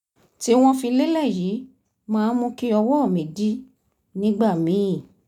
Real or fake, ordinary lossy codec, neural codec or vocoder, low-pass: real; none; none; 19.8 kHz